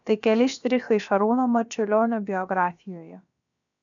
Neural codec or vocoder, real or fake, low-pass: codec, 16 kHz, about 1 kbps, DyCAST, with the encoder's durations; fake; 7.2 kHz